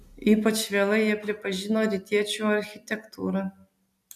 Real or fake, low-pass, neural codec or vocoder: real; 14.4 kHz; none